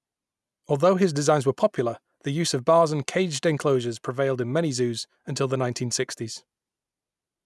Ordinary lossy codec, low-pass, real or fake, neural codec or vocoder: none; none; real; none